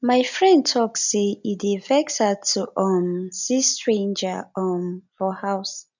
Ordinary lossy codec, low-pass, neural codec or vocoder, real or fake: none; 7.2 kHz; none; real